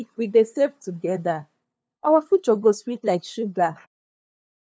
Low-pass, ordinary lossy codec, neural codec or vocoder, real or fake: none; none; codec, 16 kHz, 2 kbps, FunCodec, trained on LibriTTS, 25 frames a second; fake